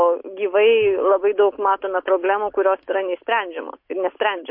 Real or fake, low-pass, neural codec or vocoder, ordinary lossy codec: real; 5.4 kHz; none; MP3, 32 kbps